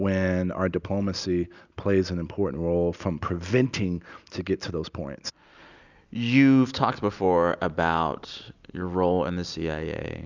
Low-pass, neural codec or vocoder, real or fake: 7.2 kHz; none; real